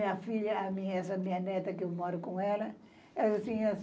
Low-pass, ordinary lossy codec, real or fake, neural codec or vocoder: none; none; real; none